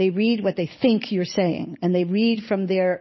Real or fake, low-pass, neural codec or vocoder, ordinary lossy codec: fake; 7.2 kHz; vocoder, 44.1 kHz, 128 mel bands every 256 samples, BigVGAN v2; MP3, 24 kbps